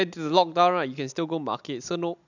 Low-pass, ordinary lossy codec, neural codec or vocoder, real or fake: 7.2 kHz; none; none; real